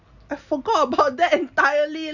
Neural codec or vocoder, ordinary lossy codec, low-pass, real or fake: none; none; 7.2 kHz; real